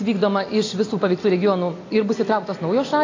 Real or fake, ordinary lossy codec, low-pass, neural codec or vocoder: real; AAC, 32 kbps; 7.2 kHz; none